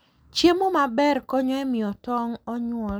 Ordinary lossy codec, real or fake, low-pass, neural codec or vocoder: none; real; none; none